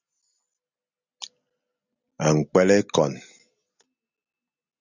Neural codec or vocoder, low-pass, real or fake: none; 7.2 kHz; real